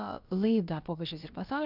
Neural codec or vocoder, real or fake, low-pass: codec, 16 kHz, about 1 kbps, DyCAST, with the encoder's durations; fake; 5.4 kHz